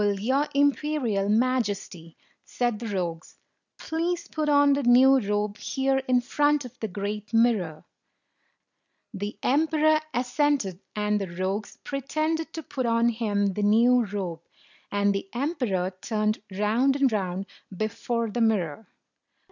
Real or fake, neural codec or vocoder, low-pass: real; none; 7.2 kHz